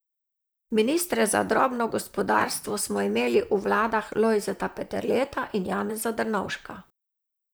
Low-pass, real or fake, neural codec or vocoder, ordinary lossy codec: none; fake; vocoder, 44.1 kHz, 128 mel bands, Pupu-Vocoder; none